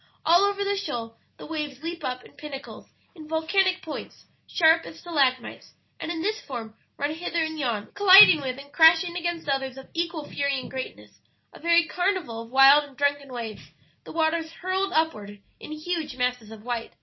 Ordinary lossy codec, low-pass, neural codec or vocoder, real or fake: MP3, 24 kbps; 7.2 kHz; none; real